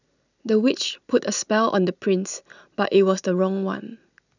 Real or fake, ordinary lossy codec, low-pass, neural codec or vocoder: real; none; 7.2 kHz; none